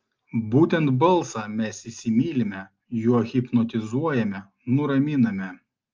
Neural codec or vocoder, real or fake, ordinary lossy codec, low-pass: none; real; Opus, 24 kbps; 7.2 kHz